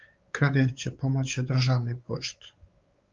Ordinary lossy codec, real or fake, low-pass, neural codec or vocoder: Opus, 32 kbps; fake; 7.2 kHz; codec, 16 kHz, 4 kbps, X-Codec, WavLM features, trained on Multilingual LibriSpeech